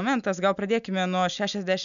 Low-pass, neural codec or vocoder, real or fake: 7.2 kHz; none; real